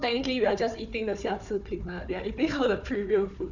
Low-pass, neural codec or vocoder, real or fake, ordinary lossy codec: 7.2 kHz; codec, 16 kHz, 4 kbps, FunCodec, trained on Chinese and English, 50 frames a second; fake; none